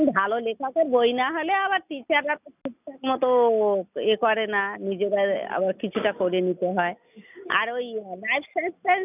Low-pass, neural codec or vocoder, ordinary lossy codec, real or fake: 3.6 kHz; none; none; real